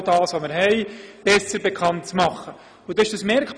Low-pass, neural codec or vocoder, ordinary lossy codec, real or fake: 9.9 kHz; none; none; real